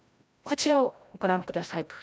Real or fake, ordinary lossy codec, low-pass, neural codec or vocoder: fake; none; none; codec, 16 kHz, 0.5 kbps, FreqCodec, larger model